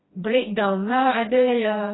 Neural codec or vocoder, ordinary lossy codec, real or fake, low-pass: codec, 16 kHz, 1 kbps, FreqCodec, smaller model; AAC, 16 kbps; fake; 7.2 kHz